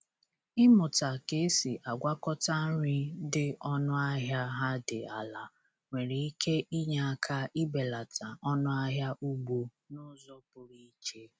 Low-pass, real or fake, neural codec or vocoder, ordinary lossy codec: none; real; none; none